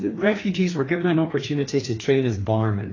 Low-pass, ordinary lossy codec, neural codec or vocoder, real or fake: 7.2 kHz; AAC, 32 kbps; codec, 16 kHz, 2 kbps, FreqCodec, larger model; fake